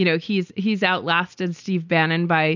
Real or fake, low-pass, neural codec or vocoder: real; 7.2 kHz; none